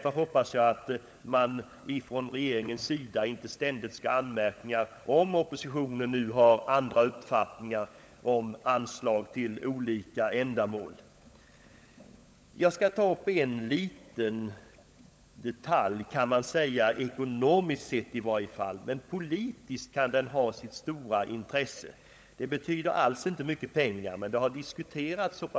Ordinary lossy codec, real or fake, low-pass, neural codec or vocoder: none; fake; none; codec, 16 kHz, 16 kbps, FunCodec, trained on LibriTTS, 50 frames a second